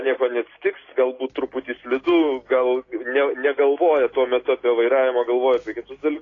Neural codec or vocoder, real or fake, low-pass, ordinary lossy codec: none; real; 7.2 kHz; AAC, 32 kbps